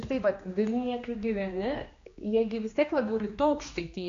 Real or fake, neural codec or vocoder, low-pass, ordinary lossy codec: fake; codec, 16 kHz, 2 kbps, X-Codec, HuBERT features, trained on general audio; 7.2 kHz; AAC, 48 kbps